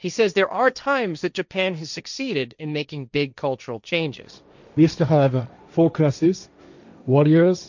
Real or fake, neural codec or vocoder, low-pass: fake; codec, 16 kHz, 1.1 kbps, Voila-Tokenizer; 7.2 kHz